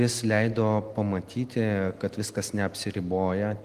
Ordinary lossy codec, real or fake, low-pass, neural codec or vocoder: Opus, 24 kbps; real; 14.4 kHz; none